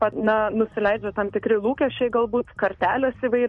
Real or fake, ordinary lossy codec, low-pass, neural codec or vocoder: real; MP3, 48 kbps; 10.8 kHz; none